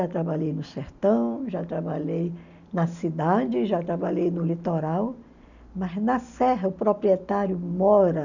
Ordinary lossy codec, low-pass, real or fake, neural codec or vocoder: none; 7.2 kHz; real; none